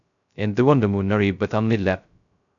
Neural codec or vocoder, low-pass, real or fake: codec, 16 kHz, 0.2 kbps, FocalCodec; 7.2 kHz; fake